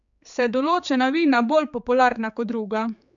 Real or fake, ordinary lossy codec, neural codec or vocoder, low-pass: fake; MP3, 96 kbps; codec, 16 kHz, 4 kbps, X-Codec, HuBERT features, trained on general audio; 7.2 kHz